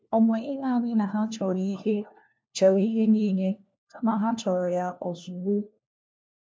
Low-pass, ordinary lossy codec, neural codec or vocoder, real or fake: none; none; codec, 16 kHz, 1 kbps, FunCodec, trained on LibriTTS, 50 frames a second; fake